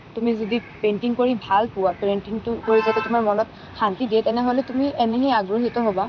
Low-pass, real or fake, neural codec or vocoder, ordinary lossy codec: 7.2 kHz; fake; vocoder, 44.1 kHz, 128 mel bands, Pupu-Vocoder; none